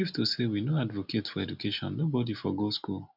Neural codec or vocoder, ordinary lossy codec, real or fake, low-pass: none; none; real; 5.4 kHz